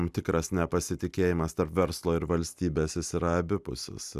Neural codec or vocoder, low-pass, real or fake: none; 14.4 kHz; real